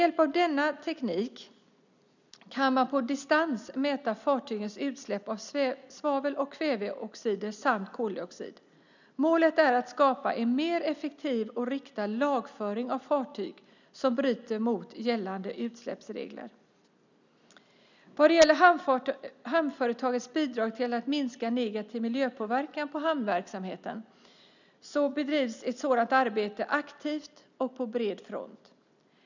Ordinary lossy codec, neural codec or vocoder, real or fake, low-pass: none; none; real; 7.2 kHz